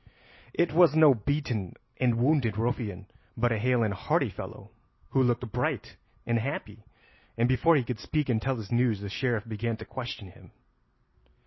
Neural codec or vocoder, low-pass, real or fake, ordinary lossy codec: none; 7.2 kHz; real; MP3, 24 kbps